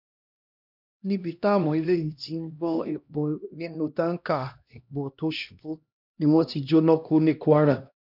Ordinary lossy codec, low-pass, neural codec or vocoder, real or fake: none; 5.4 kHz; codec, 16 kHz, 1 kbps, X-Codec, HuBERT features, trained on LibriSpeech; fake